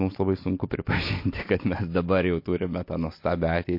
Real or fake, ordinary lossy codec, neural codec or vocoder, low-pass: real; MP3, 32 kbps; none; 5.4 kHz